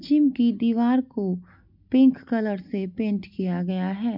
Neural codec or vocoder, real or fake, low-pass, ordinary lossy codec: none; real; 5.4 kHz; none